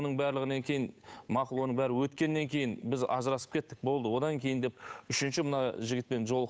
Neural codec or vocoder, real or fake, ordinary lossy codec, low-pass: codec, 16 kHz, 8 kbps, FunCodec, trained on Chinese and English, 25 frames a second; fake; none; none